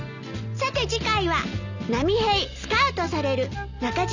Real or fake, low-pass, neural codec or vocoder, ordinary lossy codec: real; 7.2 kHz; none; none